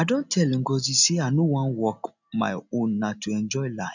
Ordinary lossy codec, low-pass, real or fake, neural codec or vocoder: none; 7.2 kHz; real; none